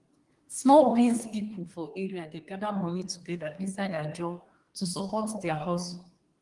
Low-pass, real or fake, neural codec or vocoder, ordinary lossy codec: 10.8 kHz; fake; codec, 24 kHz, 1 kbps, SNAC; Opus, 24 kbps